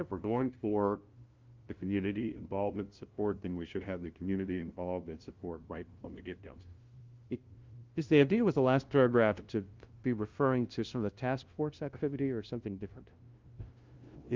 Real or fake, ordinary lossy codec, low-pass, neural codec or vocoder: fake; Opus, 32 kbps; 7.2 kHz; codec, 16 kHz, 0.5 kbps, FunCodec, trained on LibriTTS, 25 frames a second